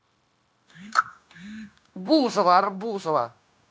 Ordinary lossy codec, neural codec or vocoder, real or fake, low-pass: none; codec, 16 kHz, 0.9 kbps, LongCat-Audio-Codec; fake; none